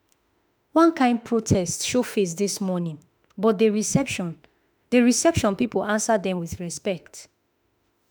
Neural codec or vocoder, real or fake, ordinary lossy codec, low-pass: autoencoder, 48 kHz, 32 numbers a frame, DAC-VAE, trained on Japanese speech; fake; none; none